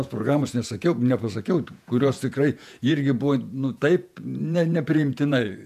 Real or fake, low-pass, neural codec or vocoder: fake; 14.4 kHz; vocoder, 48 kHz, 128 mel bands, Vocos